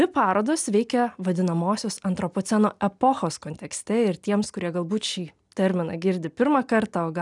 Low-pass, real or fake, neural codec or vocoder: 10.8 kHz; real; none